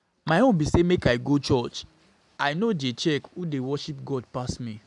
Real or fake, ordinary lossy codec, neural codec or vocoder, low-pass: real; none; none; 10.8 kHz